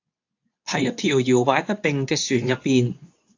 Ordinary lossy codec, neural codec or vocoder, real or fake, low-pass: AAC, 48 kbps; codec, 24 kHz, 0.9 kbps, WavTokenizer, medium speech release version 2; fake; 7.2 kHz